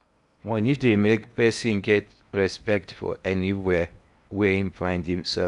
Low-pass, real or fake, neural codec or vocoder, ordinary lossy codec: 10.8 kHz; fake; codec, 16 kHz in and 24 kHz out, 0.8 kbps, FocalCodec, streaming, 65536 codes; none